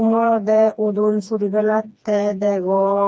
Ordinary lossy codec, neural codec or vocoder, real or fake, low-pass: none; codec, 16 kHz, 2 kbps, FreqCodec, smaller model; fake; none